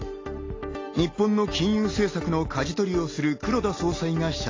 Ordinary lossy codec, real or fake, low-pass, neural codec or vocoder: AAC, 32 kbps; real; 7.2 kHz; none